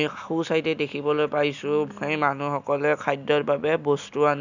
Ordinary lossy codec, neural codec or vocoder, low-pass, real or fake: none; none; 7.2 kHz; real